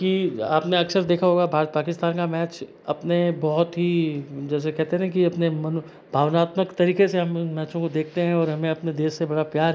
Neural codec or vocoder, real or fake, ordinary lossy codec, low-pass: none; real; none; none